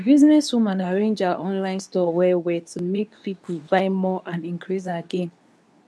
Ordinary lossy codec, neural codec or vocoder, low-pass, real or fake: none; codec, 24 kHz, 0.9 kbps, WavTokenizer, medium speech release version 2; none; fake